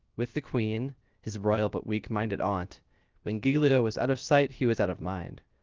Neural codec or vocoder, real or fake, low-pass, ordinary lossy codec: codec, 16 kHz, 0.7 kbps, FocalCodec; fake; 7.2 kHz; Opus, 32 kbps